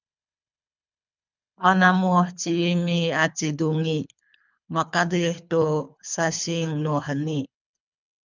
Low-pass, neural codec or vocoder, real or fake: 7.2 kHz; codec, 24 kHz, 3 kbps, HILCodec; fake